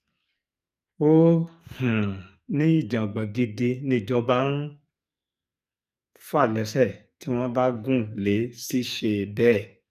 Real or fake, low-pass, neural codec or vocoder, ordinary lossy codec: fake; 14.4 kHz; codec, 44.1 kHz, 2.6 kbps, SNAC; none